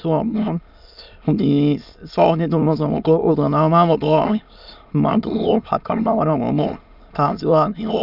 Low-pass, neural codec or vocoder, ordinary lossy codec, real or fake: 5.4 kHz; autoencoder, 22.05 kHz, a latent of 192 numbers a frame, VITS, trained on many speakers; none; fake